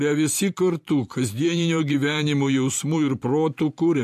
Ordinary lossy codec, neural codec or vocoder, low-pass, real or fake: MP3, 64 kbps; vocoder, 44.1 kHz, 128 mel bands every 512 samples, BigVGAN v2; 14.4 kHz; fake